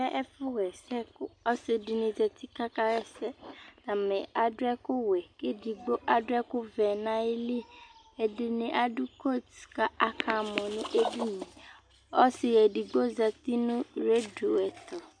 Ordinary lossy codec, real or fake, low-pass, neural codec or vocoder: MP3, 64 kbps; real; 9.9 kHz; none